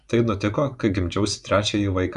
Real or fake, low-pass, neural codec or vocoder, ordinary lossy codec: fake; 10.8 kHz; vocoder, 24 kHz, 100 mel bands, Vocos; MP3, 96 kbps